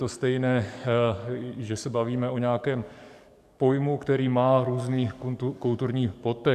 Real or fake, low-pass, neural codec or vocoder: fake; 14.4 kHz; codec, 44.1 kHz, 7.8 kbps, DAC